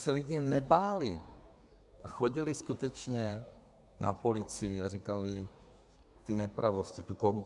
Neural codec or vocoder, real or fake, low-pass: codec, 24 kHz, 1 kbps, SNAC; fake; 10.8 kHz